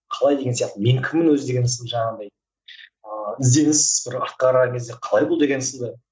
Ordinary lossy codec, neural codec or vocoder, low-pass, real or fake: none; none; none; real